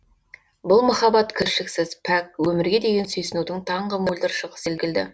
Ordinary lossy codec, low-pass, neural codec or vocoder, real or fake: none; none; none; real